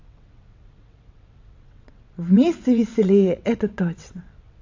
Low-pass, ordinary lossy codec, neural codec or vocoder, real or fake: 7.2 kHz; AAC, 48 kbps; vocoder, 22.05 kHz, 80 mel bands, WaveNeXt; fake